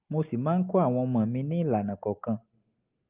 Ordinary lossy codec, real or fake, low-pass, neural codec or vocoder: Opus, 32 kbps; real; 3.6 kHz; none